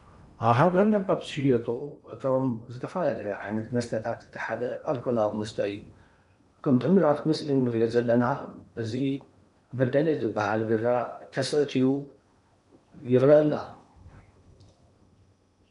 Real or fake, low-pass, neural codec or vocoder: fake; 10.8 kHz; codec, 16 kHz in and 24 kHz out, 0.8 kbps, FocalCodec, streaming, 65536 codes